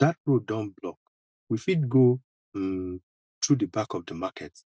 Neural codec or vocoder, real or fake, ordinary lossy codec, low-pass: none; real; none; none